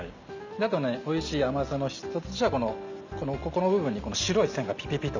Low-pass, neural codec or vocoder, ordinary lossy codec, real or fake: 7.2 kHz; none; none; real